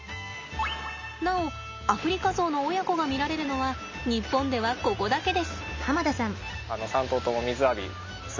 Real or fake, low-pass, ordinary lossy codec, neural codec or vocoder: real; 7.2 kHz; MP3, 64 kbps; none